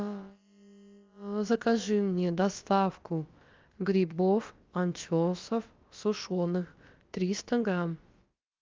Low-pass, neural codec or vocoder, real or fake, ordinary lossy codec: 7.2 kHz; codec, 16 kHz, about 1 kbps, DyCAST, with the encoder's durations; fake; Opus, 32 kbps